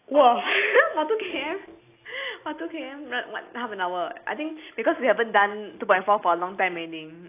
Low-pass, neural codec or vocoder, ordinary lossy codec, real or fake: 3.6 kHz; none; AAC, 24 kbps; real